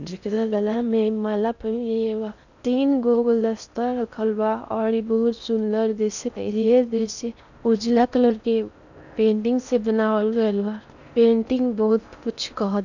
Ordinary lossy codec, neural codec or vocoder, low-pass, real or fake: none; codec, 16 kHz in and 24 kHz out, 0.6 kbps, FocalCodec, streaming, 4096 codes; 7.2 kHz; fake